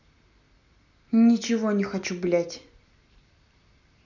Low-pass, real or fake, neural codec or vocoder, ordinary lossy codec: 7.2 kHz; real; none; none